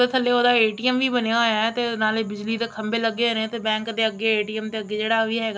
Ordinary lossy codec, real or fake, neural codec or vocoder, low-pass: none; real; none; none